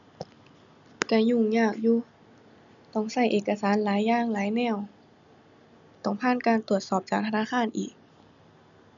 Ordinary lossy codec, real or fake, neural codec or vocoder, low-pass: none; real; none; 7.2 kHz